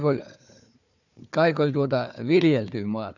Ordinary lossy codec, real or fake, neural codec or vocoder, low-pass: none; fake; codec, 16 kHz, 4 kbps, FunCodec, trained on Chinese and English, 50 frames a second; 7.2 kHz